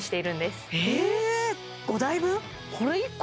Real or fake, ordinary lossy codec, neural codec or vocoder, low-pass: real; none; none; none